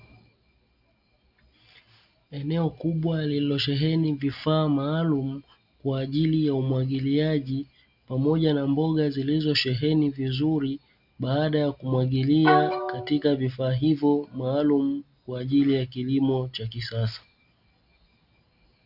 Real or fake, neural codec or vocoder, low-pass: real; none; 5.4 kHz